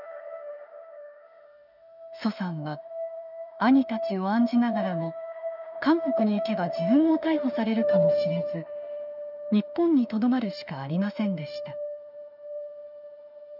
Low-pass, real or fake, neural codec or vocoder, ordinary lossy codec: 5.4 kHz; fake; autoencoder, 48 kHz, 32 numbers a frame, DAC-VAE, trained on Japanese speech; none